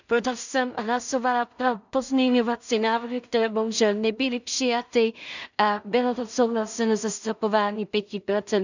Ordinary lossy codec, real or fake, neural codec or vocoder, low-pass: none; fake; codec, 16 kHz in and 24 kHz out, 0.4 kbps, LongCat-Audio-Codec, two codebook decoder; 7.2 kHz